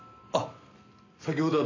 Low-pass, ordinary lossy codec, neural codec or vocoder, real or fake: 7.2 kHz; AAC, 32 kbps; none; real